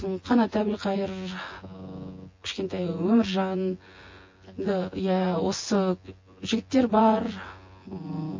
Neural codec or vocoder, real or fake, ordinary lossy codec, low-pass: vocoder, 24 kHz, 100 mel bands, Vocos; fake; MP3, 32 kbps; 7.2 kHz